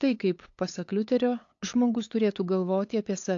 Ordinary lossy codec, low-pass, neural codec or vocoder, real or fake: AAC, 48 kbps; 7.2 kHz; codec, 16 kHz, 8 kbps, FunCodec, trained on LibriTTS, 25 frames a second; fake